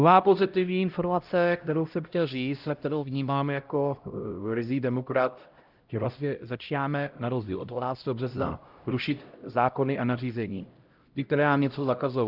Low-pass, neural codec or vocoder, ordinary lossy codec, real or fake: 5.4 kHz; codec, 16 kHz, 0.5 kbps, X-Codec, HuBERT features, trained on LibriSpeech; Opus, 24 kbps; fake